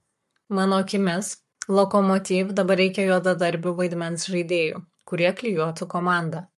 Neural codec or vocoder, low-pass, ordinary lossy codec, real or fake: codec, 44.1 kHz, 7.8 kbps, Pupu-Codec; 14.4 kHz; MP3, 64 kbps; fake